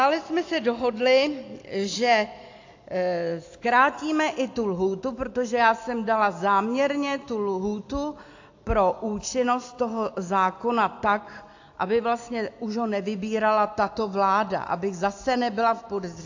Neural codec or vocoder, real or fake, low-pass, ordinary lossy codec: none; real; 7.2 kHz; AAC, 48 kbps